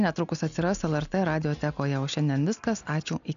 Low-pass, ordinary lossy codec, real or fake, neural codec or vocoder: 7.2 kHz; AAC, 96 kbps; real; none